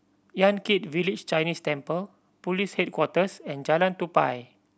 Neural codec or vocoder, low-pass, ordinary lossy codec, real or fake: none; none; none; real